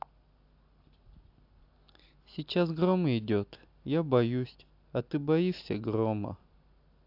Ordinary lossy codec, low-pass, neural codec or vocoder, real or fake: AAC, 48 kbps; 5.4 kHz; none; real